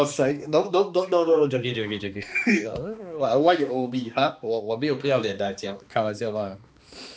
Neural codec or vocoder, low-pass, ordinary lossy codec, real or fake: codec, 16 kHz, 2 kbps, X-Codec, HuBERT features, trained on balanced general audio; none; none; fake